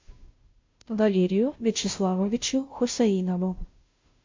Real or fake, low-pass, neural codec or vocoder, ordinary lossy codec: fake; 7.2 kHz; codec, 16 kHz, 0.5 kbps, FunCodec, trained on Chinese and English, 25 frames a second; MP3, 48 kbps